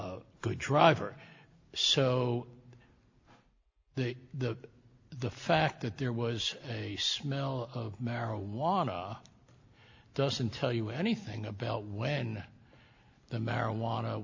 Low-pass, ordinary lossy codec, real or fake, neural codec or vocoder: 7.2 kHz; AAC, 48 kbps; real; none